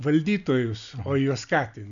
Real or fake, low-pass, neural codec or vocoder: real; 7.2 kHz; none